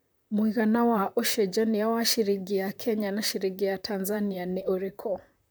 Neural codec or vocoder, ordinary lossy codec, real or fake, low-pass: vocoder, 44.1 kHz, 128 mel bands, Pupu-Vocoder; none; fake; none